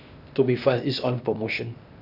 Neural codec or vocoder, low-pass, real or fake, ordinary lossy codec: codec, 16 kHz, 0.8 kbps, ZipCodec; 5.4 kHz; fake; none